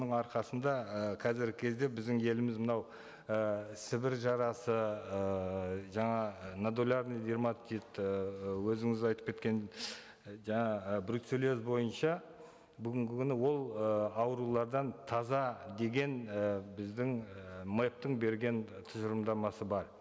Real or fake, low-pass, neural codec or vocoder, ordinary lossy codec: real; none; none; none